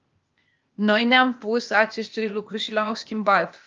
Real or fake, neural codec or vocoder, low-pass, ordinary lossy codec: fake; codec, 16 kHz, 0.8 kbps, ZipCodec; 7.2 kHz; Opus, 24 kbps